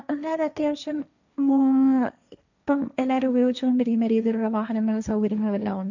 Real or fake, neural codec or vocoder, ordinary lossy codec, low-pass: fake; codec, 16 kHz, 1.1 kbps, Voila-Tokenizer; none; 7.2 kHz